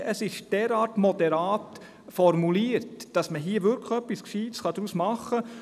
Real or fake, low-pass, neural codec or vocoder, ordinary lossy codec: real; 14.4 kHz; none; none